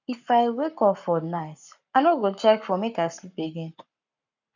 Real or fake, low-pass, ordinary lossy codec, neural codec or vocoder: fake; 7.2 kHz; none; codec, 44.1 kHz, 7.8 kbps, Pupu-Codec